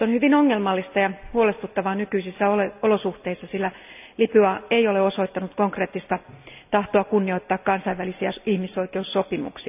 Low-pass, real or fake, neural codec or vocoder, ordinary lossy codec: 3.6 kHz; real; none; none